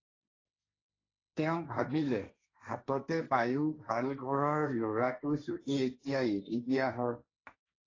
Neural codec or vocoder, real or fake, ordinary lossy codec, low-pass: codec, 16 kHz, 1.1 kbps, Voila-Tokenizer; fake; AAC, 32 kbps; 7.2 kHz